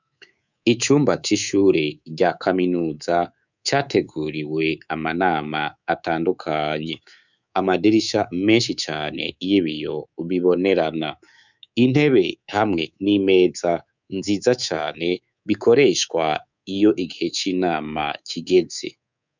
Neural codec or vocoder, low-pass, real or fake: codec, 24 kHz, 3.1 kbps, DualCodec; 7.2 kHz; fake